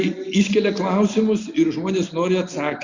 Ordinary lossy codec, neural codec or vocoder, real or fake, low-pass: Opus, 64 kbps; none; real; 7.2 kHz